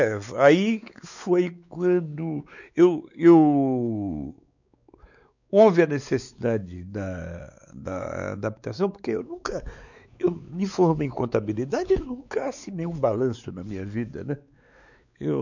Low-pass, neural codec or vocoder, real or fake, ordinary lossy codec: 7.2 kHz; codec, 16 kHz, 4 kbps, X-Codec, WavLM features, trained on Multilingual LibriSpeech; fake; none